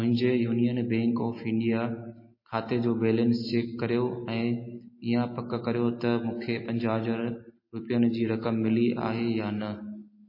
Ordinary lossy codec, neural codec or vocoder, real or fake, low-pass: MP3, 24 kbps; none; real; 5.4 kHz